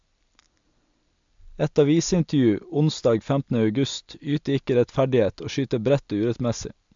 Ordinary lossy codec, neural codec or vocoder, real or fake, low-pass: MP3, 64 kbps; none; real; 7.2 kHz